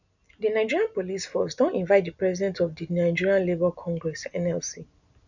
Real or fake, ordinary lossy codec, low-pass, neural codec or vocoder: real; none; 7.2 kHz; none